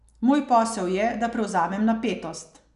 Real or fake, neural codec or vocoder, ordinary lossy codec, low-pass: real; none; none; 10.8 kHz